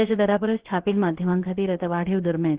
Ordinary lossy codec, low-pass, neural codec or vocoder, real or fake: Opus, 16 kbps; 3.6 kHz; codec, 16 kHz, about 1 kbps, DyCAST, with the encoder's durations; fake